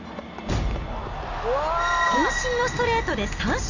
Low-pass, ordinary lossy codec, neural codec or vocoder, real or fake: 7.2 kHz; none; none; real